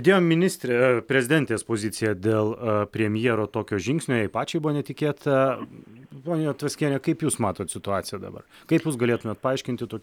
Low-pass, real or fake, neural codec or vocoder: 19.8 kHz; real; none